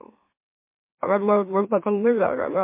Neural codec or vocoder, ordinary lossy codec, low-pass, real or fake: autoencoder, 44.1 kHz, a latent of 192 numbers a frame, MeloTTS; MP3, 24 kbps; 3.6 kHz; fake